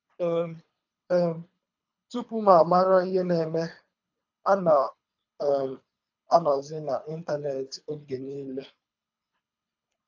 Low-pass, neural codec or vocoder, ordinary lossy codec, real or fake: 7.2 kHz; codec, 24 kHz, 3 kbps, HILCodec; AAC, 48 kbps; fake